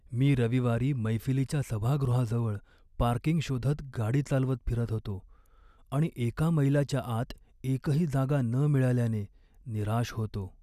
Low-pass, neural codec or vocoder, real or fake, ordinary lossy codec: 14.4 kHz; none; real; none